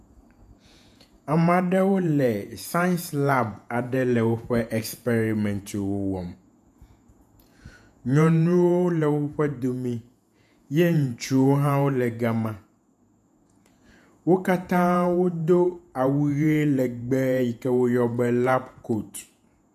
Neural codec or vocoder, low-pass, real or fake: vocoder, 48 kHz, 128 mel bands, Vocos; 14.4 kHz; fake